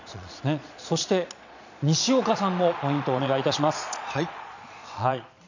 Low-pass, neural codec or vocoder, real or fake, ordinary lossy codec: 7.2 kHz; vocoder, 22.05 kHz, 80 mel bands, Vocos; fake; none